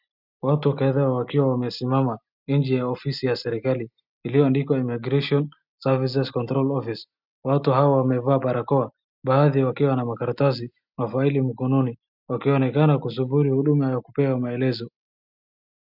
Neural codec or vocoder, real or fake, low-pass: none; real; 5.4 kHz